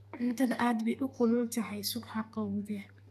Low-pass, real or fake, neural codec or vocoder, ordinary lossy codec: 14.4 kHz; fake; codec, 32 kHz, 1.9 kbps, SNAC; none